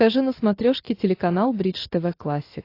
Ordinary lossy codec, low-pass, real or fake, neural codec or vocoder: AAC, 32 kbps; 5.4 kHz; real; none